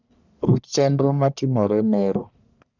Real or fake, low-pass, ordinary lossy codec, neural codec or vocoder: fake; 7.2 kHz; none; codec, 44.1 kHz, 1.7 kbps, Pupu-Codec